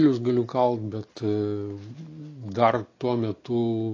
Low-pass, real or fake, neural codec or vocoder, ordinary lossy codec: 7.2 kHz; real; none; AAC, 32 kbps